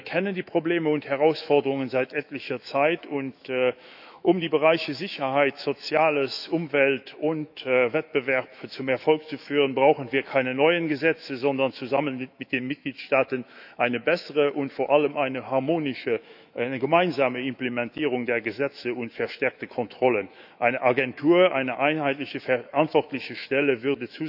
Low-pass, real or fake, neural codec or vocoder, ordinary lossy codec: 5.4 kHz; fake; autoencoder, 48 kHz, 128 numbers a frame, DAC-VAE, trained on Japanese speech; none